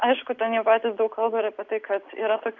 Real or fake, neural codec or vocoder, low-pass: real; none; 7.2 kHz